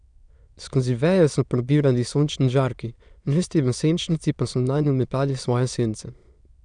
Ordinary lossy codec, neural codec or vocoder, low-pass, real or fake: none; autoencoder, 22.05 kHz, a latent of 192 numbers a frame, VITS, trained on many speakers; 9.9 kHz; fake